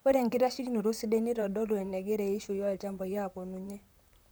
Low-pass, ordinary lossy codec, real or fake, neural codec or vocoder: none; none; fake; vocoder, 44.1 kHz, 128 mel bands, Pupu-Vocoder